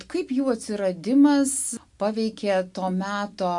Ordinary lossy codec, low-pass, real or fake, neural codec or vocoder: MP3, 64 kbps; 10.8 kHz; real; none